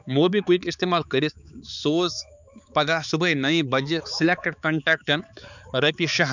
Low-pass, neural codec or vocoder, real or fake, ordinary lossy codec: 7.2 kHz; codec, 16 kHz, 4 kbps, X-Codec, HuBERT features, trained on balanced general audio; fake; none